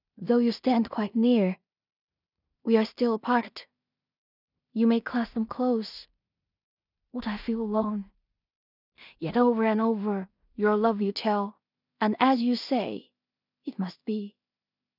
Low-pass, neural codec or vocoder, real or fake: 5.4 kHz; codec, 16 kHz in and 24 kHz out, 0.4 kbps, LongCat-Audio-Codec, two codebook decoder; fake